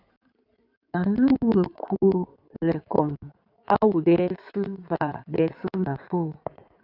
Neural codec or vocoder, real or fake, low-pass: codec, 16 kHz in and 24 kHz out, 2.2 kbps, FireRedTTS-2 codec; fake; 5.4 kHz